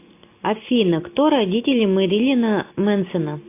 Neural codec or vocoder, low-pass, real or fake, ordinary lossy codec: none; 3.6 kHz; real; AAC, 24 kbps